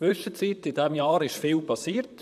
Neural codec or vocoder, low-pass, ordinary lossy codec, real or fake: vocoder, 44.1 kHz, 128 mel bands, Pupu-Vocoder; 14.4 kHz; none; fake